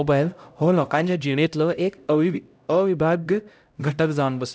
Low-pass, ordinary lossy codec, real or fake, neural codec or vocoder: none; none; fake; codec, 16 kHz, 0.5 kbps, X-Codec, HuBERT features, trained on LibriSpeech